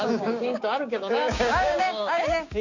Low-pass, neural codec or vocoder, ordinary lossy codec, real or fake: 7.2 kHz; codec, 16 kHz, 6 kbps, DAC; Opus, 64 kbps; fake